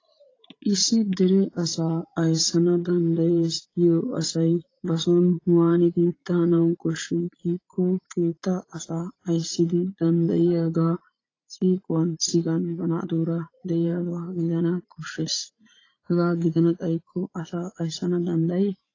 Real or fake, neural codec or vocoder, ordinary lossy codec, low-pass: fake; vocoder, 44.1 kHz, 80 mel bands, Vocos; AAC, 32 kbps; 7.2 kHz